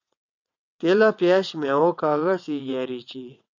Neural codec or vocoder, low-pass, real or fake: vocoder, 22.05 kHz, 80 mel bands, WaveNeXt; 7.2 kHz; fake